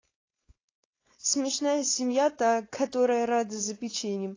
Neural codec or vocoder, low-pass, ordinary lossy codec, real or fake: codec, 16 kHz, 4.8 kbps, FACodec; 7.2 kHz; AAC, 32 kbps; fake